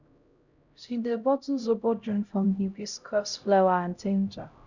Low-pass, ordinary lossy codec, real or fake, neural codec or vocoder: 7.2 kHz; none; fake; codec, 16 kHz, 0.5 kbps, X-Codec, HuBERT features, trained on LibriSpeech